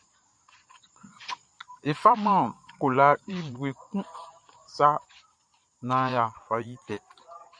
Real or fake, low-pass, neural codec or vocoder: fake; 9.9 kHz; vocoder, 22.05 kHz, 80 mel bands, Vocos